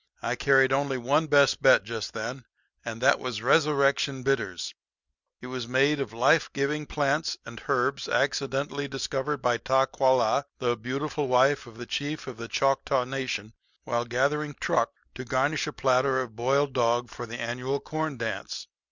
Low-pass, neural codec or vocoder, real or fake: 7.2 kHz; none; real